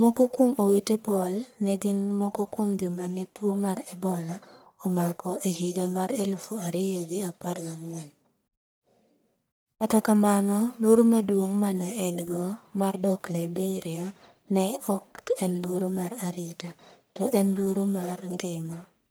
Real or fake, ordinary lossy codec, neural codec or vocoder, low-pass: fake; none; codec, 44.1 kHz, 1.7 kbps, Pupu-Codec; none